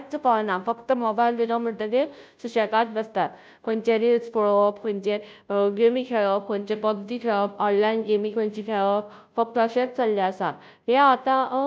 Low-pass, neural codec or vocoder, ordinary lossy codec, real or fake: none; codec, 16 kHz, 0.5 kbps, FunCodec, trained on Chinese and English, 25 frames a second; none; fake